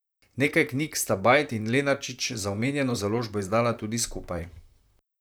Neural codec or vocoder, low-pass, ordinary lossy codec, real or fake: vocoder, 44.1 kHz, 128 mel bands, Pupu-Vocoder; none; none; fake